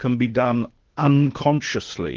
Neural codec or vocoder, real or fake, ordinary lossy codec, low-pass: codec, 16 kHz, 0.8 kbps, ZipCodec; fake; Opus, 16 kbps; 7.2 kHz